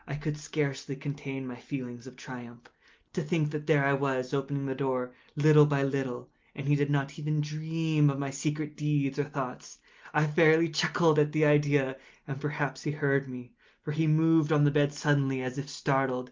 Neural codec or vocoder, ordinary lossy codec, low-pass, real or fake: none; Opus, 32 kbps; 7.2 kHz; real